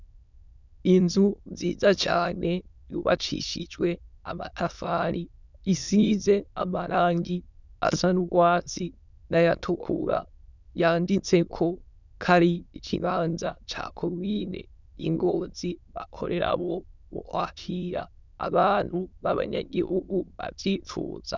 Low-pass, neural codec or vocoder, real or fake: 7.2 kHz; autoencoder, 22.05 kHz, a latent of 192 numbers a frame, VITS, trained on many speakers; fake